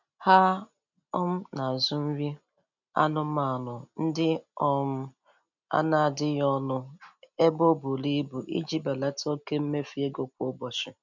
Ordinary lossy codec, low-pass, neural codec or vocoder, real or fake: none; 7.2 kHz; none; real